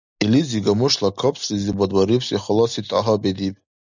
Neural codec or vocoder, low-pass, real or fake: none; 7.2 kHz; real